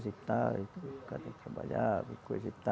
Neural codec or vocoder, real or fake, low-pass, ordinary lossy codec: none; real; none; none